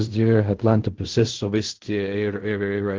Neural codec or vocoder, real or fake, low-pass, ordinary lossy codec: codec, 16 kHz in and 24 kHz out, 0.4 kbps, LongCat-Audio-Codec, fine tuned four codebook decoder; fake; 7.2 kHz; Opus, 16 kbps